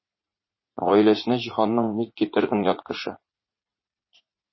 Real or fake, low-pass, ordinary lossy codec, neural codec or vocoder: fake; 7.2 kHz; MP3, 24 kbps; vocoder, 44.1 kHz, 80 mel bands, Vocos